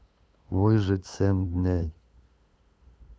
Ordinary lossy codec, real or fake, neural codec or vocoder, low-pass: none; fake; codec, 16 kHz, 8 kbps, FunCodec, trained on LibriTTS, 25 frames a second; none